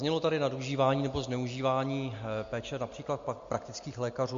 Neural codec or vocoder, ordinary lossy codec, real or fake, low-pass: none; MP3, 48 kbps; real; 7.2 kHz